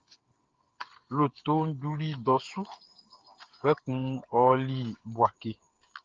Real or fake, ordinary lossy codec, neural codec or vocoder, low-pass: fake; Opus, 32 kbps; codec, 16 kHz, 8 kbps, FreqCodec, smaller model; 7.2 kHz